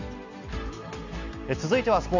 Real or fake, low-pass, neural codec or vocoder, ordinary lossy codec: real; 7.2 kHz; none; none